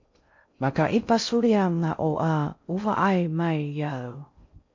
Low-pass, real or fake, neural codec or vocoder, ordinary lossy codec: 7.2 kHz; fake; codec, 16 kHz in and 24 kHz out, 0.6 kbps, FocalCodec, streaming, 2048 codes; MP3, 48 kbps